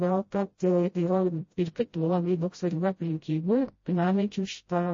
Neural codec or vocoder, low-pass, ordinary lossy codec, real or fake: codec, 16 kHz, 0.5 kbps, FreqCodec, smaller model; 7.2 kHz; MP3, 32 kbps; fake